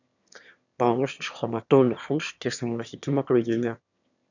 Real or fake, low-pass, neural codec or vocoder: fake; 7.2 kHz; autoencoder, 22.05 kHz, a latent of 192 numbers a frame, VITS, trained on one speaker